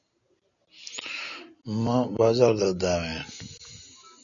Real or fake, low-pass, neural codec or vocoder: real; 7.2 kHz; none